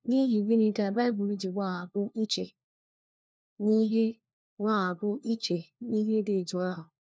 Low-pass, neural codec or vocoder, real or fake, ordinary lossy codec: none; codec, 16 kHz, 1 kbps, FunCodec, trained on LibriTTS, 50 frames a second; fake; none